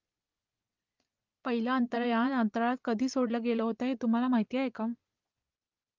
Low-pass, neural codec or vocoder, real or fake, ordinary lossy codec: 7.2 kHz; vocoder, 24 kHz, 100 mel bands, Vocos; fake; Opus, 24 kbps